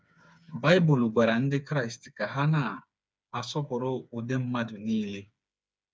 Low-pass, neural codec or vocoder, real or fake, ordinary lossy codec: none; codec, 16 kHz, 4 kbps, FreqCodec, smaller model; fake; none